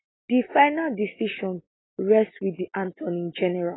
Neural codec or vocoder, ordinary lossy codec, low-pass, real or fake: none; AAC, 16 kbps; 7.2 kHz; real